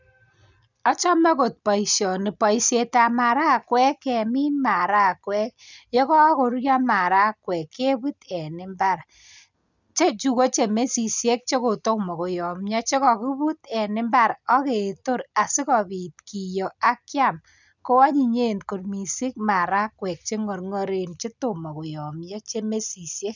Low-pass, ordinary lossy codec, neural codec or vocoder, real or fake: 7.2 kHz; none; none; real